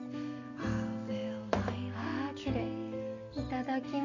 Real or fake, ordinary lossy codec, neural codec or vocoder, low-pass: real; none; none; 7.2 kHz